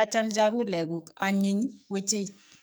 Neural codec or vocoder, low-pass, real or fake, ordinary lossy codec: codec, 44.1 kHz, 2.6 kbps, SNAC; none; fake; none